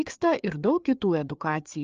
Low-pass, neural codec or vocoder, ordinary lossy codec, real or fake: 7.2 kHz; codec, 16 kHz, 4 kbps, FreqCodec, larger model; Opus, 24 kbps; fake